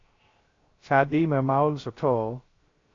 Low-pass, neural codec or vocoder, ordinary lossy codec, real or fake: 7.2 kHz; codec, 16 kHz, 0.3 kbps, FocalCodec; AAC, 32 kbps; fake